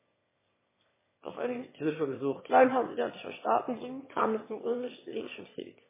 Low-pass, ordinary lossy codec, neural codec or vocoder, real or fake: 3.6 kHz; MP3, 16 kbps; autoencoder, 22.05 kHz, a latent of 192 numbers a frame, VITS, trained on one speaker; fake